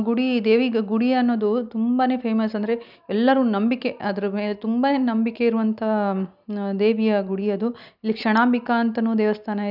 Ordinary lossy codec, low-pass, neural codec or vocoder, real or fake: none; 5.4 kHz; none; real